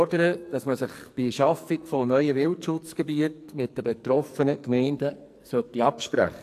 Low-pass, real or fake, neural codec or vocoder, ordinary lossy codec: 14.4 kHz; fake; codec, 44.1 kHz, 2.6 kbps, SNAC; AAC, 64 kbps